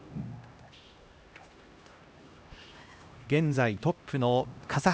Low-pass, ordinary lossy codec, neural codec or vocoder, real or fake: none; none; codec, 16 kHz, 1 kbps, X-Codec, HuBERT features, trained on LibriSpeech; fake